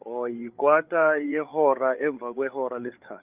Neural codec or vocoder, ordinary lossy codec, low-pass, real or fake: codec, 16 kHz, 8 kbps, FreqCodec, larger model; Opus, 24 kbps; 3.6 kHz; fake